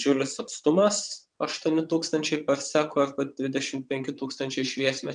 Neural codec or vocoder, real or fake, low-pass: vocoder, 22.05 kHz, 80 mel bands, WaveNeXt; fake; 9.9 kHz